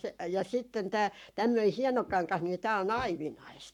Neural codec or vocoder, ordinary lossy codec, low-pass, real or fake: codec, 44.1 kHz, 7.8 kbps, Pupu-Codec; none; 19.8 kHz; fake